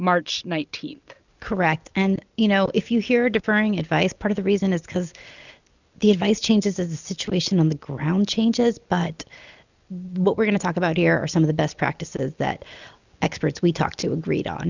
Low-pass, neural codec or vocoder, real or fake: 7.2 kHz; none; real